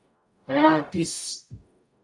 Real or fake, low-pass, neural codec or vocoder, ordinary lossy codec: fake; 10.8 kHz; codec, 44.1 kHz, 0.9 kbps, DAC; MP3, 96 kbps